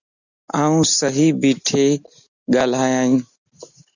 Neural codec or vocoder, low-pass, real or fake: none; 7.2 kHz; real